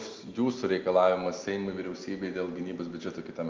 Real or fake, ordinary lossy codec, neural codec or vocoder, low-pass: real; Opus, 24 kbps; none; 7.2 kHz